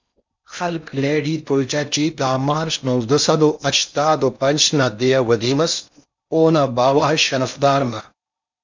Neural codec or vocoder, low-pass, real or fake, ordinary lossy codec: codec, 16 kHz in and 24 kHz out, 0.6 kbps, FocalCodec, streaming, 4096 codes; 7.2 kHz; fake; MP3, 64 kbps